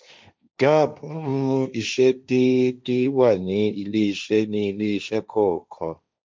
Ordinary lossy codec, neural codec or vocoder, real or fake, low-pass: none; codec, 16 kHz, 1.1 kbps, Voila-Tokenizer; fake; none